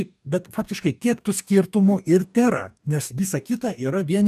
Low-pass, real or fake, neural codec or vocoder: 14.4 kHz; fake; codec, 44.1 kHz, 2.6 kbps, DAC